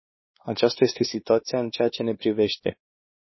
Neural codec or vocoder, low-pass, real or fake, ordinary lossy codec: codec, 16 kHz, 2 kbps, X-Codec, WavLM features, trained on Multilingual LibriSpeech; 7.2 kHz; fake; MP3, 24 kbps